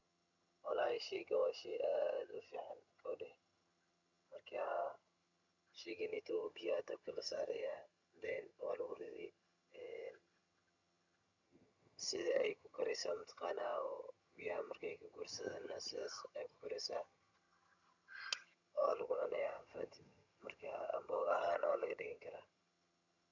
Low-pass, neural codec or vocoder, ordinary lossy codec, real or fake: 7.2 kHz; vocoder, 22.05 kHz, 80 mel bands, HiFi-GAN; none; fake